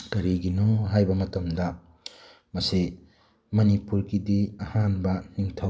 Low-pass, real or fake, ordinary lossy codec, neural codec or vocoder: none; real; none; none